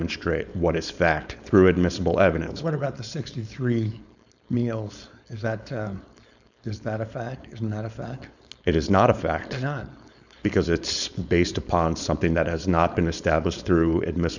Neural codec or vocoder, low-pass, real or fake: codec, 16 kHz, 4.8 kbps, FACodec; 7.2 kHz; fake